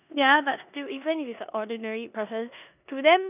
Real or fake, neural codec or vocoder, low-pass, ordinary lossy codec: fake; codec, 16 kHz in and 24 kHz out, 0.9 kbps, LongCat-Audio-Codec, four codebook decoder; 3.6 kHz; none